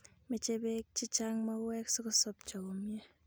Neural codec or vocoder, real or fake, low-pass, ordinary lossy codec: none; real; none; none